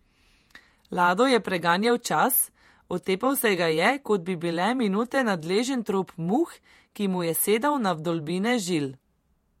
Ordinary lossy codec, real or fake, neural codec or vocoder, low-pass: MP3, 64 kbps; fake; vocoder, 48 kHz, 128 mel bands, Vocos; 19.8 kHz